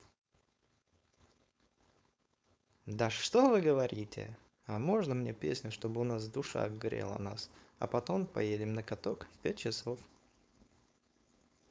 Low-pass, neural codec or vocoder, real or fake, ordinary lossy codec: none; codec, 16 kHz, 4.8 kbps, FACodec; fake; none